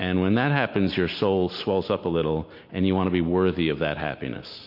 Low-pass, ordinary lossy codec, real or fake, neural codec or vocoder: 5.4 kHz; MP3, 32 kbps; real; none